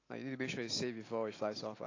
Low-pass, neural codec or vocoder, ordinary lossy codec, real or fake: 7.2 kHz; none; AAC, 32 kbps; real